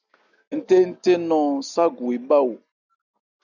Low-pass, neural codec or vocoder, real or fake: 7.2 kHz; none; real